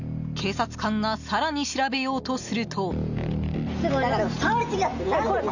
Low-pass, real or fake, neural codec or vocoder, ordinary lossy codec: 7.2 kHz; real; none; none